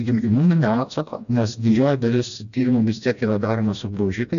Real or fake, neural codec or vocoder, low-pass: fake; codec, 16 kHz, 1 kbps, FreqCodec, smaller model; 7.2 kHz